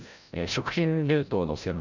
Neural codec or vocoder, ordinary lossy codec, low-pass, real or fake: codec, 16 kHz, 0.5 kbps, FreqCodec, larger model; none; 7.2 kHz; fake